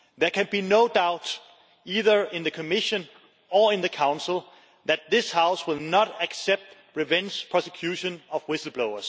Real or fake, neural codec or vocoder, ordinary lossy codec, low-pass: real; none; none; none